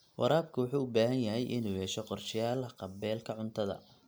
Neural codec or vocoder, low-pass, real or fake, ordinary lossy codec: none; none; real; none